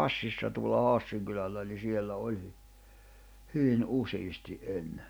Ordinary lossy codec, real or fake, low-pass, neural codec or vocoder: none; real; none; none